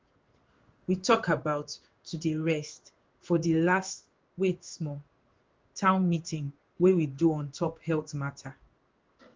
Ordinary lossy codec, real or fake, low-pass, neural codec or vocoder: Opus, 32 kbps; fake; 7.2 kHz; codec, 16 kHz in and 24 kHz out, 1 kbps, XY-Tokenizer